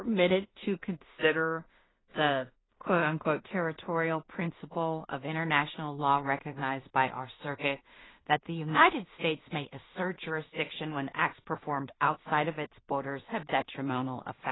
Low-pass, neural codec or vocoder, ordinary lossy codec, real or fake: 7.2 kHz; codec, 16 kHz in and 24 kHz out, 0.4 kbps, LongCat-Audio-Codec, two codebook decoder; AAC, 16 kbps; fake